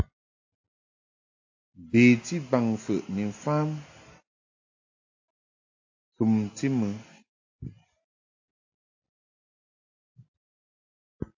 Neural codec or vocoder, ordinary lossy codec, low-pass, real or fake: none; AAC, 48 kbps; 7.2 kHz; real